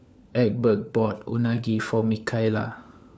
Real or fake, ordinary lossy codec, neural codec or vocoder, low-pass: fake; none; codec, 16 kHz, 4 kbps, FunCodec, trained on LibriTTS, 50 frames a second; none